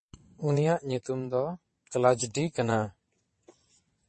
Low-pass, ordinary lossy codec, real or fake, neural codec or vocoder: 9.9 kHz; MP3, 32 kbps; fake; vocoder, 22.05 kHz, 80 mel bands, WaveNeXt